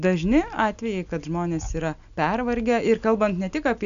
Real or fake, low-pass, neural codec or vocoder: real; 7.2 kHz; none